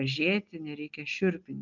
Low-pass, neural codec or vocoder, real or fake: 7.2 kHz; none; real